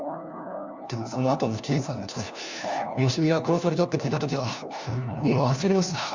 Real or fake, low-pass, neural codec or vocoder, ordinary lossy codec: fake; 7.2 kHz; codec, 16 kHz, 1 kbps, FunCodec, trained on LibriTTS, 50 frames a second; Opus, 64 kbps